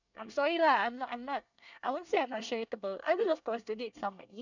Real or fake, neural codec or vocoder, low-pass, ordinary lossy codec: fake; codec, 24 kHz, 1 kbps, SNAC; 7.2 kHz; none